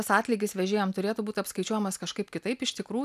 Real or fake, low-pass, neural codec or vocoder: real; 14.4 kHz; none